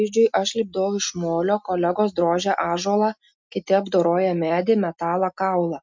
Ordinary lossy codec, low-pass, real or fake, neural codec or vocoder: MP3, 64 kbps; 7.2 kHz; real; none